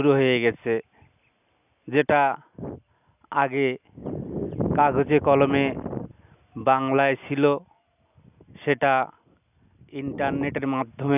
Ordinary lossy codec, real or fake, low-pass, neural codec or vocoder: none; real; 3.6 kHz; none